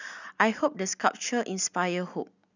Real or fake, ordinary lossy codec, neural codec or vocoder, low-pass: real; none; none; 7.2 kHz